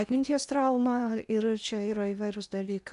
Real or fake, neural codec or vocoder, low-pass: fake; codec, 16 kHz in and 24 kHz out, 0.8 kbps, FocalCodec, streaming, 65536 codes; 10.8 kHz